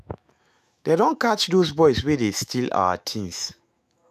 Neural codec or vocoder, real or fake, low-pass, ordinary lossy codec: autoencoder, 48 kHz, 128 numbers a frame, DAC-VAE, trained on Japanese speech; fake; 14.4 kHz; none